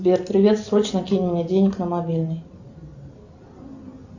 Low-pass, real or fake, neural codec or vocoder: 7.2 kHz; real; none